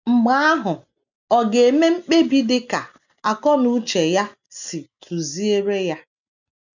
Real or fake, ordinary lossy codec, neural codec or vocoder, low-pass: real; none; none; 7.2 kHz